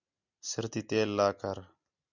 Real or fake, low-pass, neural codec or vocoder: real; 7.2 kHz; none